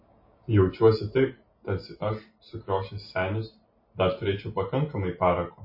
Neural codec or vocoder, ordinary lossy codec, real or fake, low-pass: none; MP3, 24 kbps; real; 5.4 kHz